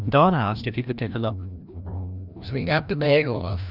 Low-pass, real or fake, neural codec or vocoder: 5.4 kHz; fake; codec, 16 kHz, 1 kbps, FreqCodec, larger model